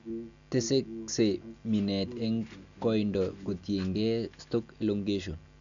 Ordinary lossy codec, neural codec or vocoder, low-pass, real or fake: none; none; 7.2 kHz; real